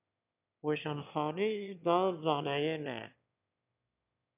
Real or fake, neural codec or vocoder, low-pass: fake; autoencoder, 22.05 kHz, a latent of 192 numbers a frame, VITS, trained on one speaker; 3.6 kHz